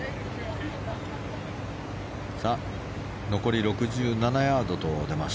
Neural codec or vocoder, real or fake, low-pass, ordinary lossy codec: none; real; none; none